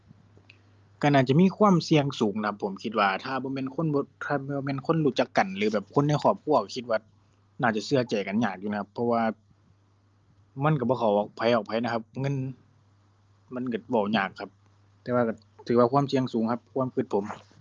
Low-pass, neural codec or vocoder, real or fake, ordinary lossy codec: 7.2 kHz; none; real; Opus, 32 kbps